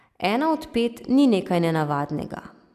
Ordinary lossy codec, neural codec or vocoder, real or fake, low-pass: AAC, 96 kbps; none; real; 14.4 kHz